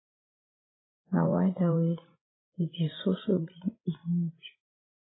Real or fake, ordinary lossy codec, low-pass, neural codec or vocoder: fake; AAC, 16 kbps; 7.2 kHz; codec, 16 kHz, 16 kbps, FreqCodec, larger model